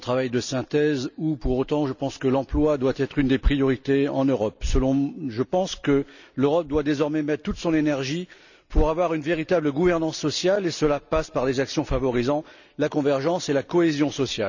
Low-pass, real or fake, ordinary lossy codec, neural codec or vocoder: 7.2 kHz; real; none; none